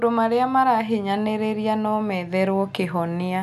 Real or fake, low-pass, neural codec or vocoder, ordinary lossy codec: real; 14.4 kHz; none; none